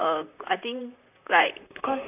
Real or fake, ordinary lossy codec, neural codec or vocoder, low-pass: fake; none; vocoder, 44.1 kHz, 128 mel bands, Pupu-Vocoder; 3.6 kHz